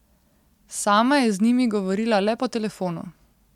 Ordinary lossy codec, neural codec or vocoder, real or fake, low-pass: MP3, 96 kbps; none; real; 19.8 kHz